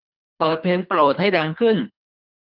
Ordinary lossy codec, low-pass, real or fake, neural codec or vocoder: none; 5.4 kHz; fake; codec, 24 kHz, 3 kbps, HILCodec